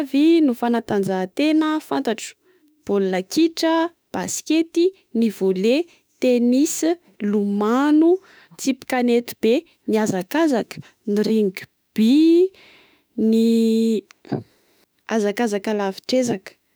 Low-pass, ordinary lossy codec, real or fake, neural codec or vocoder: none; none; fake; autoencoder, 48 kHz, 32 numbers a frame, DAC-VAE, trained on Japanese speech